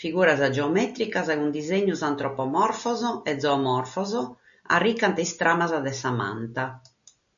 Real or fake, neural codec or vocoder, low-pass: real; none; 7.2 kHz